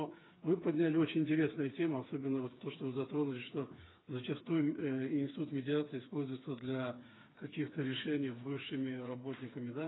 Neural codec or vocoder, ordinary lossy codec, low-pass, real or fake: codec, 16 kHz, 4 kbps, FreqCodec, smaller model; AAC, 16 kbps; 7.2 kHz; fake